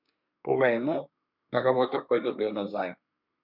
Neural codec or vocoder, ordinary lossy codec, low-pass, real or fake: codec, 24 kHz, 1 kbps, SNAC; MP3, 48 kbps; 5.4 kHz; fake